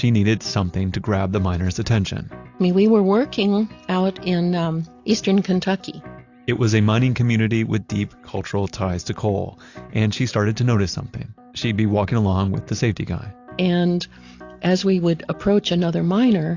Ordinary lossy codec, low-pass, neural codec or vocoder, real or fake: AAC, 48 kbps; 7.2 kHz; none; real